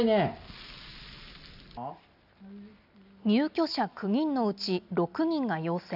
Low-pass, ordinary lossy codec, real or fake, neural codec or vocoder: 5.4 kHz; none; real; none